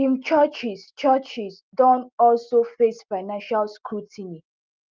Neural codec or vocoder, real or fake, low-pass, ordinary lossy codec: none; real; 7.2 kHz; Opus, 32 kbps